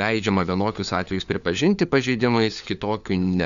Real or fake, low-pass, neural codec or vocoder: fake; 7.2 kHz; codec, 16 kHz, 4 kbps, FunCodec, trained on LibriTTS, 50 frames a second